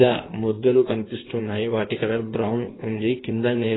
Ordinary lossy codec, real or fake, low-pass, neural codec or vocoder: AAC, 16 kbps; fake; 7.2 kHz; codec, 16 kHz in and 24 kHz out, 1.1 kbps, FireRedTTS-2 codec